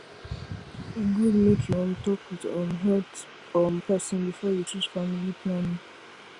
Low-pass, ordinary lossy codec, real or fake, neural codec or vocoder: 10.8 kHz; Opus, 64 kbps; real; none